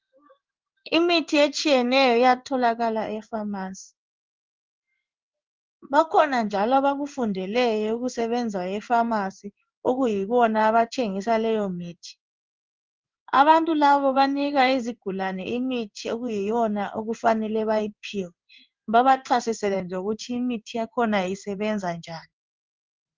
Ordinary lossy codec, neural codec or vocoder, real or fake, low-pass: Opus, 32 kbps; codec, 16 kHz in and 24 kHz out, 1 kbps, XY-Tokenizer; fake; 7.2 kHz